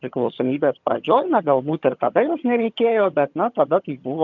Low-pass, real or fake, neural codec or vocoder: 7.2 kHz; fake; vocoder, 22.05 kHz, 80 mel bands, HiFi-GAN